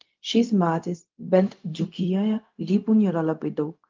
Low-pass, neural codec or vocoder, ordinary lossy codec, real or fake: 7.2 kHz; codec, 16 kHz, 0.4 kbps, LongCat-Audio-Codec; Opus, 24 kbps; fake